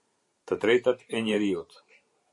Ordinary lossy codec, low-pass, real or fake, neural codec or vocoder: MP3, 48 kbps; 10.8 kHz; fake; vocoder, 44.1 kHz, 128 mel bands every 512 samples, BigVGAN v2